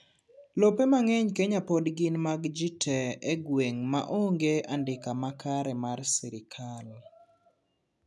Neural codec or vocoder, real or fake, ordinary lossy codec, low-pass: none; real; none; none